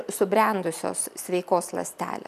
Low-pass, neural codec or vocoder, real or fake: 14.4 kHz; none; real